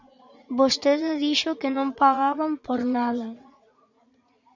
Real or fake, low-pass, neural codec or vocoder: fake; 7.2 kHz; vocoder, 44.1 kHz, 80 mel bands, Vocos